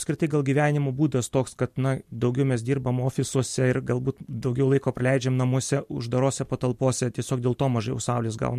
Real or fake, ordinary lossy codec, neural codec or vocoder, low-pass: real; MP3, 64 kbps; none; 14.4 kHz